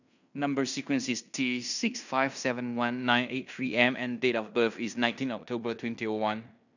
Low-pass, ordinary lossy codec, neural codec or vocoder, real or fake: 7.2 kHz; none; codec, 16 kHz in and 24 kHz out, 0.9 kbps, LongCat-Audio-Codec, fine tuned four codebook decoder; fake